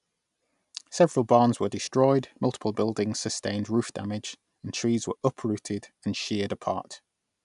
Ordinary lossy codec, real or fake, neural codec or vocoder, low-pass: none; real; none; 10.8 kHz